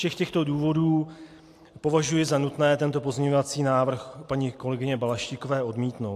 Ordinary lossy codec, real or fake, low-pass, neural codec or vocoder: AAC, 64 kbps; real; 14.4 kHz; none